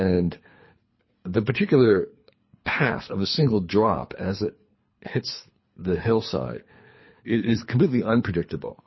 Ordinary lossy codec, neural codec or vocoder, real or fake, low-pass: MP3, 24 kbps; codec, 24 kHz, 3 kbps, HILCodec; fake; 7.2 kHz